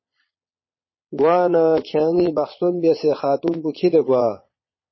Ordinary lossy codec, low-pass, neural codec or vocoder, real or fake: MP3, 24 kbps; 7.2 kHz; vocoder, 22.05 kHz, 80 mel bands, Vocos; fake